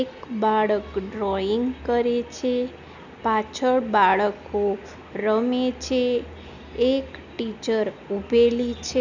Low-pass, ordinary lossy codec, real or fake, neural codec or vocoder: 7.2 kHz; none; real; none